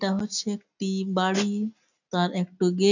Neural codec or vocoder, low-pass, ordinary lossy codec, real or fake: none; 7.2 kHz; none; real